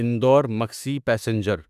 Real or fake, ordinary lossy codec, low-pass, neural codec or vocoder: fake; none; 14.4 kHz; autoencoder, 48 kHz, 32 numbers a frame, DAC-VAE, trained on Japanese speech